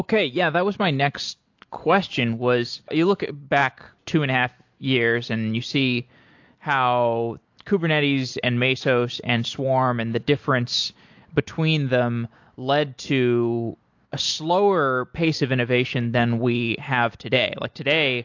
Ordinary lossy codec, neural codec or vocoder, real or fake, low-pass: AAC, 48 kbps; none; real; 7.2 kHz